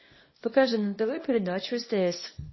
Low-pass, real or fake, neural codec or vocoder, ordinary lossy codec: 7.2 kHz; fake; codec, 24 kHz, 0.9 kbps, WavTokenizer, small release; MP3, 24 kbps